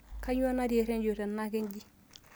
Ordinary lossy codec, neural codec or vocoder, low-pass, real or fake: none; none; none; real